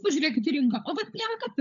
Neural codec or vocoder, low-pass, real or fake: codec, 16 kHz, 16 kbps, FunCodec, trained on LibriTTS, 50 frames a second; 7.2 kHz; fake